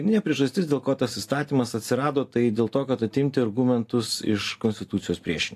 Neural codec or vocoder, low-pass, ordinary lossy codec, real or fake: none; 14.4 kHz; AAC, 64 kbps; real